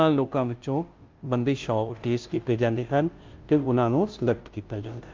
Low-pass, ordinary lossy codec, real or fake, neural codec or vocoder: 7.2 kHz; Opus, 32 kbps; fake; codec, 16 kHz, 0.5 kbps, FunCodec, trained on Chinese and English, 25 frames a second